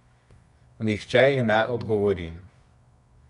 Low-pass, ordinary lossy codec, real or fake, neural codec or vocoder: 10.8 kHz; none; fake; codec, 24 kHz, 0.9 kbps, WavTokenizer, medium music audio release